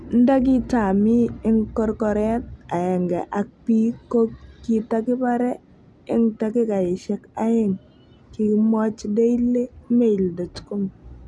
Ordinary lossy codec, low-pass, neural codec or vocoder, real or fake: none; none; none; real